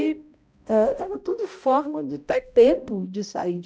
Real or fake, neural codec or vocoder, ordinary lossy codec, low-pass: fake; codec, 16 kHz, 0.5 kbps, X-Codec, HuBERT features, trained on balanced general audio; none; none